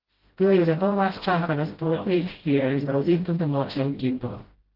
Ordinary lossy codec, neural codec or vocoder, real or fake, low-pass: Opus, 16 kbps; codec, 16 kHz, 0.5 kbps, FreqCodec, smaller model; fake; 5.4 kHz